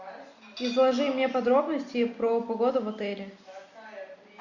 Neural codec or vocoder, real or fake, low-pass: none; real; 7.2 kHz